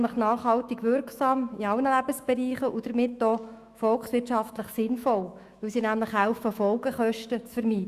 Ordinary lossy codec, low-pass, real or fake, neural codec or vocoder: Opus, 64 kbps; 14.4 kHz; fake; autoencoder, 48 kHz, 128 numbers a frame, DAC-VAE, trained on Japanese speech